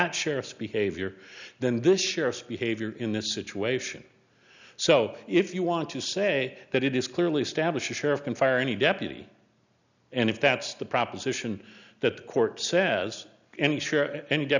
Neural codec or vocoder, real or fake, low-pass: none; real; 7.2 kHz